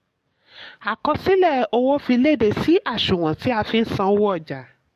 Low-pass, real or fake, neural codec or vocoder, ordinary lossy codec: 14.4 kHz; fake; codec, 44.1 kHz, 7.8 kbps, DAC; MP3, 64 kbps